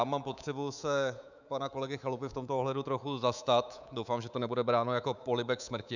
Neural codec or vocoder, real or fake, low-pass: codec, 24 kHz, 3.1 kbps, DualCodec; fake; 7.2 kHz